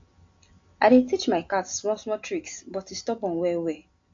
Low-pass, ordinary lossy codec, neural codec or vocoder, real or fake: 7.2 kHz; AAC, 48 kbps; none; real